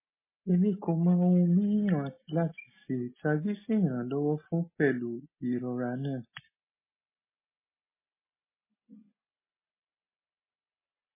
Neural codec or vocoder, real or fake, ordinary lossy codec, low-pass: none; real; MP3, 16 kbps; 3.6 kHz